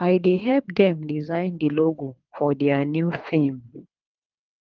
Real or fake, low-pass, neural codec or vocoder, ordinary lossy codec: fake; 7.2 kHz; codec, 24 kHz, 3 kbps, HILCodec; Opus, 24 kbps